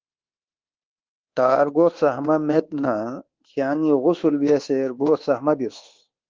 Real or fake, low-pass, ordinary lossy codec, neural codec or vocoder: fake; 7.2 kHz; Opus, 16 kbps; codec, 24 kHz, 1.2 kbps, DualCodec